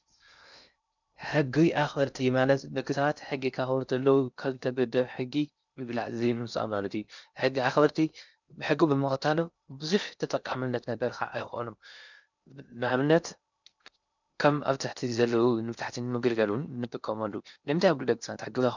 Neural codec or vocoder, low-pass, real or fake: codec, 16 kHz in and 24 kHz out, 0.6 kbps, FocalCodec, streaming, 2048 codes; 7.2 kHz; fake